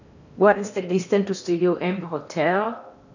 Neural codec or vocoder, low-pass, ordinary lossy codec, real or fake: codec, 16 kHz in and 24 kHz out, 0.8 kbps, FocalCodec, streaming, 65536 codes; 7.2 kHz; none; fake